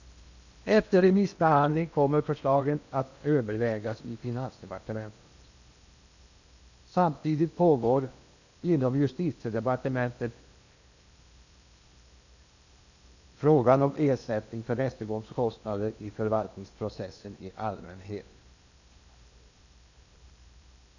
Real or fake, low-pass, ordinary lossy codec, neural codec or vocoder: fake; 7.2 kHz; none; codec, 16 kHz in and 24 kHz out, 0.8 kbps, FocalCodec, streaming, 65536 codes